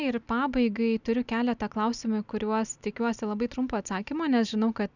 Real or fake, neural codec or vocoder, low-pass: real; none; 7.2 kHz